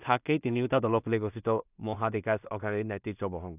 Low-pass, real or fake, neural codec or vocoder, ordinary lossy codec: 3.6 kHz; fake; codec, 16 kHz in and 24 kHz out, 0.4 kbps, LongCat-Audio-Codec, two codebook decoder; none